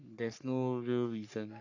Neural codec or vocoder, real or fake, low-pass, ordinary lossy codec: codec, 44.1 kHz, 3.4 kbps, Pupu-Codec; fake; 7.2 kHz; none